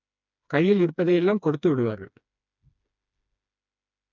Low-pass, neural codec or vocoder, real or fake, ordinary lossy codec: 7.2 kHz; codec, 16 kHz, 2 kbps, FreqCodec, smaller model; fake; none